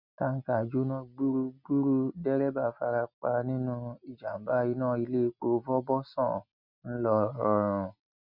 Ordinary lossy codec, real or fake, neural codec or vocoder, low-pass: none; real; none; 5.4 kHz